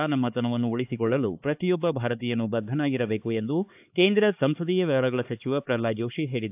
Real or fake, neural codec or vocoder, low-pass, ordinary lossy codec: fake; codec, 16 kHz, 4 kbps, X-Codec, HuBERT features, trained on LibriSpeech; 3.6 kHz; AAC, 32 kbps